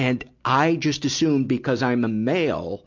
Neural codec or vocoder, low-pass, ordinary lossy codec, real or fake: none; 7.2 kHz; MP3, 64 kbps; real